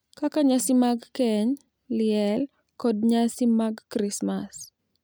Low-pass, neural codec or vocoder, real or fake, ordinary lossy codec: none; none; real; none